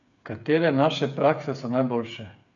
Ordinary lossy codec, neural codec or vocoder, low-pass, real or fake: none; codec, 16 kHz, 8 kbps, FreqCodec, smaller model; 7.2 kHz; fake